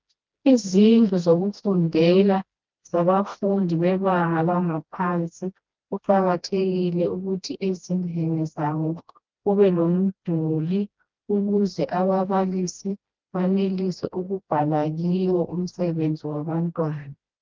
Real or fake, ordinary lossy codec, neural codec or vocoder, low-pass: fake; Opus, 32 kbps; codec, 16 kHz, 1 kbps, FreqCodec, smaller model; 7.2 kHz